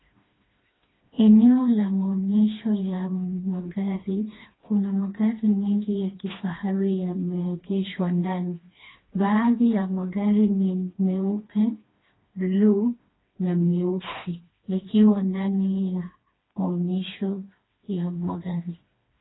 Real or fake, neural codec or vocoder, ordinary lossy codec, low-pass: fake; codec, 16 kHz, 2 kbps, FreqCodec, smaller model; AAC, 16 kbps; 7.2 kHz